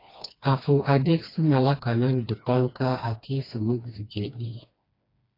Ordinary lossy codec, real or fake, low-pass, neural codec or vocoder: AAC, 32 kbps; fake; 5.4 kHz; codec, 16 kHz, 2 kbps, FreqCodec, smaller model